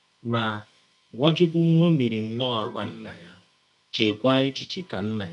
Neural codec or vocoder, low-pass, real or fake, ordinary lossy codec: codec, 24 kHz, 0.9 kbps, WavTokenizer, medium music audio release; 10.8 kHz; fake; none